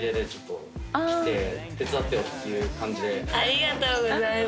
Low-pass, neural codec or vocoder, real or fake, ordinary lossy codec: none; none; real; none